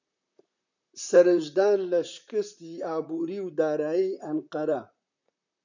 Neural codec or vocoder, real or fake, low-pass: vocoder, 44.1 kHz, 128 mel bands, Pupu-Vocoder; fake; 7.2 kHz